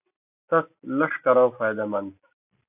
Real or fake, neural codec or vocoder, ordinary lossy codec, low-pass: real; none; AAC, 32 kbps; 3.6 kHz